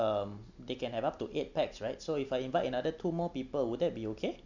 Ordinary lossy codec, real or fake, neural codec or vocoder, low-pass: none; real; none; 7.2 kHz